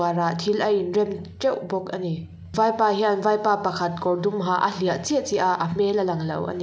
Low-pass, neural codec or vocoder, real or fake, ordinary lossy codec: none; none; real; none